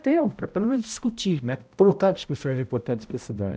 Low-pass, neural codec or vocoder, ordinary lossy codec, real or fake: none; codec, 16 kHz, 0.5 kbps, X-Codec, HuBERT features, trained on balanced general audio; none; fake